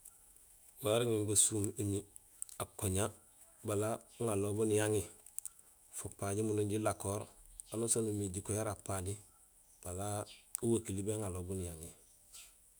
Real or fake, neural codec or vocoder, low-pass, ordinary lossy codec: fake; autoencoder, 48 kHz, 128 numbers a frame, DAC-VAE, trained on Japanese speech; none; none